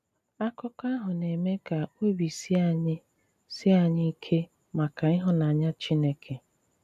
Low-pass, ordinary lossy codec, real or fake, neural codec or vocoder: 14.4 kHz; none; real; none